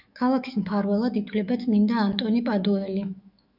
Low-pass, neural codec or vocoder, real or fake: 5.4 kHz; autoencoder, 48 kHz, 128 numbers a frame, DAC-VAE, trained on Japanese speech; fake